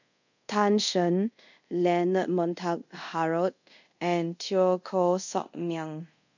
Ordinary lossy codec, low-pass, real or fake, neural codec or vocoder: none; 7.2 kHz; fake; codec, 24 kHz, 0.5 kbps, DualCodec